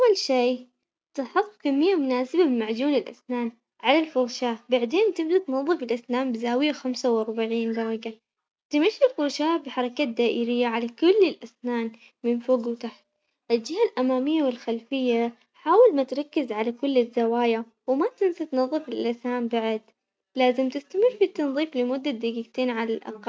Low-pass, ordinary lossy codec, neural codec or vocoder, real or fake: none; none; none; real